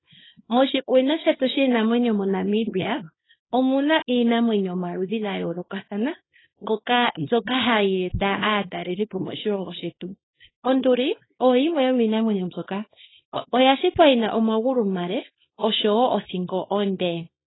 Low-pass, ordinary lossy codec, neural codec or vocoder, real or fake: 7.2 kHz; AAC, 16 kbps; codec, 24 kHz, 0.9 kbps, WavTokenizer, small release; fake